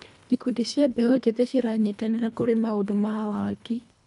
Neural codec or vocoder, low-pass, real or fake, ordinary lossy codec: codec, 24 kHz, 1.5 kbps, HILCodec; 10.8 kHz; fake; none